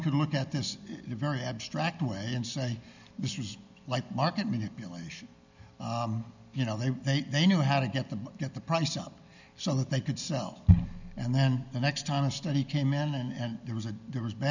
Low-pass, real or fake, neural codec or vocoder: 7.2 kHz; real; none